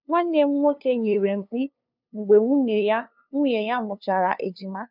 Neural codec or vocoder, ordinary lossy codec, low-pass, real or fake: codec, 16 kHz, 2 kbps, FunCodec, trained on LibriTTS, 25 frames a second; none; 5.4 kHz; fake